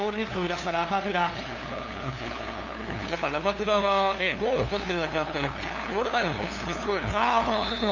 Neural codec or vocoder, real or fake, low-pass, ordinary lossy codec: codec, 16 kHz, 2 kbps, FunCodec, trained on LibriTTS, 25 frames a second; fake; 7.2 kHz; none